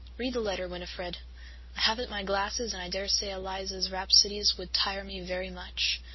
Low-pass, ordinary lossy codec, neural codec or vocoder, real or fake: 7.2 kHz; MP3, 24 kbps; none; real